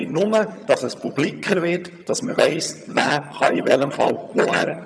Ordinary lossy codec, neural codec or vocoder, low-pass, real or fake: none; vocoder, 22.05 kHz, 80 mel bands, HiFi-GAN; none; fake